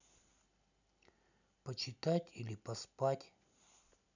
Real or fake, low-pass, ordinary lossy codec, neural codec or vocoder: real; 7.2 kHz; none; none